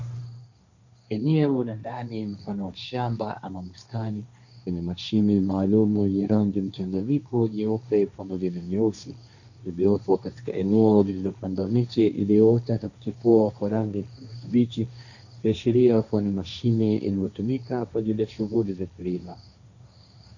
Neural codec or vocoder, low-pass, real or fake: codec, 16 kHz, 1.1 kbps, Voila-Tokenizer; 7.2 kHz; fake